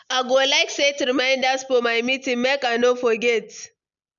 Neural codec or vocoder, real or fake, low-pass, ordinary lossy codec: none; real; 7.2 kHz; none